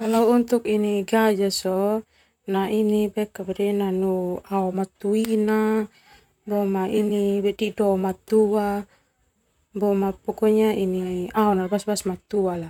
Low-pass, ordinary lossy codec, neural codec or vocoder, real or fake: 19.8 kHz; none; vocoder, 44.1 kHz, 128 mel bands, Pupu-Vocoder; fake